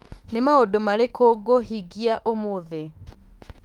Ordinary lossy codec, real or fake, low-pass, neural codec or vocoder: Opus, 32 kbps; fake; 19.8 kHz; autoencoder, 48 kHz, 32 numbers a frame, DAC-VAE, trained on Japanese speech